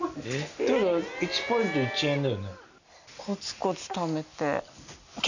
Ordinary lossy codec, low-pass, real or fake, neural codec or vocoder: none; 7.2 kHz; real; none